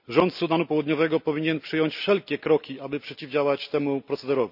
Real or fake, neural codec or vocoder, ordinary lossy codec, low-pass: real; none; none; 5.4 kHz